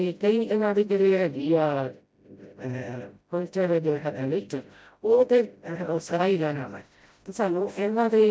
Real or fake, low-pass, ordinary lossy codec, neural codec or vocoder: fake; none; none; codec, 16 kHz, 0.5 kbps, FreqCodec, smaller model